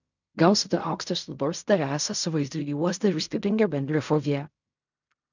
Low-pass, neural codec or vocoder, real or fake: 7.2 kHz; codec, 16 kHz in and 24 kHz out, 0.4 kbps, LongCat-Audio-Codec, fine tuned four codebook decoder; fake